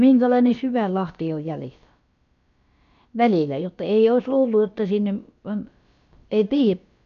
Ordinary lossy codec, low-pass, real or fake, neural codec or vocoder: none; 7.2 kHz; fake; codec, 16 kHz, about 1 kbps, DyCAST, with the encoder's durations